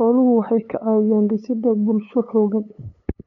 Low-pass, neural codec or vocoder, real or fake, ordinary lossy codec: 7.2 kHz; codec, 16 kHz, 8 kbps, FunCodec, trained on LibriTTS, 25 frames a second; fake; none